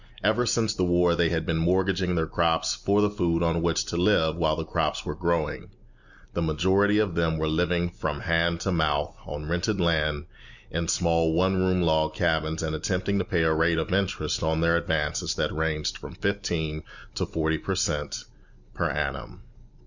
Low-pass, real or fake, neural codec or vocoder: 7.2 kHz; real; none